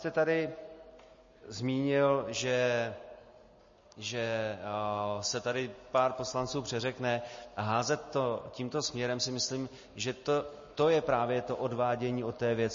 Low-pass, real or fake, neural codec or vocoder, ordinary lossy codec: 7.2 kHz; real; none; MP3, 32 kbps